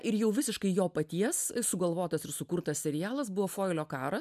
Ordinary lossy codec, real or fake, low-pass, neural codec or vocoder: MP3, 96 kbps; real; 14.4 kHz; none